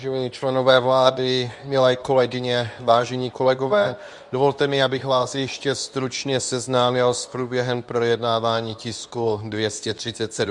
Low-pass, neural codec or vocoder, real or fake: 10.8 kHz; codec, 24 kHz, 0.9 kbps, WavTokenizer, medium speech release version 2; fake